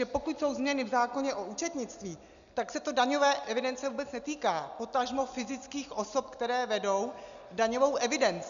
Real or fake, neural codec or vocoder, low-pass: real; none; 7.2 kHz